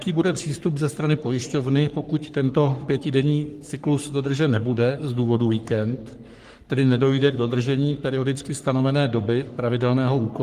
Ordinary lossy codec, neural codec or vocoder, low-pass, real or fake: Opus, 24 kbps; codec, 44.1 kHz, 3.4 kbps, Pupu-Codec; 14.4 kHz; fake